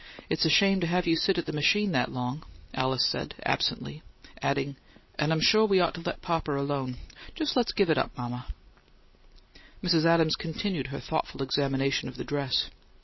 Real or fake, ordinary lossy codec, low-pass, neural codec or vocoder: real; MP3, 24 kbps; 7.2 kHz; none